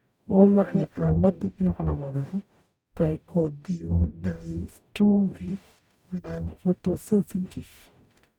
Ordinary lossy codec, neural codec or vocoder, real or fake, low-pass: none; codec, 44.1 kHz, 0.9 kbps, DAC; fake; 19.8 kHz